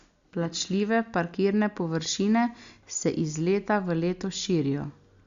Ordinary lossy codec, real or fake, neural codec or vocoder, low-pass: Opus, 64 kbps; real; none; 7.2 kHz